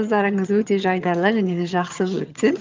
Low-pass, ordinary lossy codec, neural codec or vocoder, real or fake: 7.2 kHz; Opus, 24 kbps; vocoder, 22.05 kHz, 80 mel bands, HiFi-GAN; fake